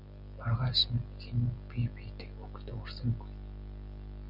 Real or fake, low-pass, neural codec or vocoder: real; 5.4 kHz; none